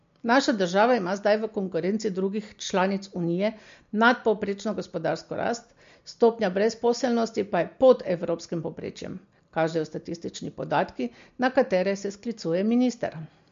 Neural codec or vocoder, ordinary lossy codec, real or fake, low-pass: none; MP3, 48 kbps; real; 7.2 kHz